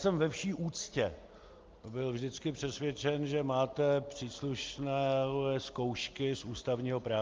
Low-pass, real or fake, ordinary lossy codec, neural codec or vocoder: 7.2 kHz; real; Opus, 24 kbps; none